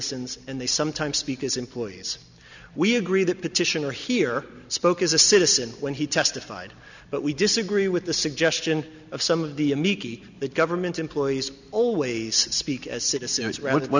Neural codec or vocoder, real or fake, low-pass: none; real; 7.2 kHz